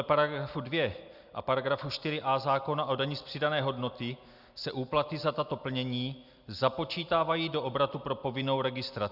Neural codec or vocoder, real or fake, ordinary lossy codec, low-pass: none; real; Opus, 64 kbps; 5.4 kHz